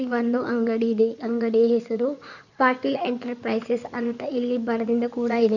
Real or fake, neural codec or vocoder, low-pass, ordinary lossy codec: fake; codec, 16 kHz in and 24 kHz out, 2.2 kbps, FireRedTTS-2 codec; 7.2 kHz; none